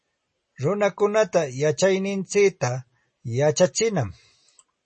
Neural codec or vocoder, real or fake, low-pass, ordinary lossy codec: none; real; 9.9 kHz; MP3, 32 kbps